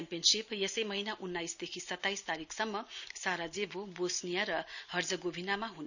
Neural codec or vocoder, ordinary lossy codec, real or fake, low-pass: none; none; real; 7.2 kHz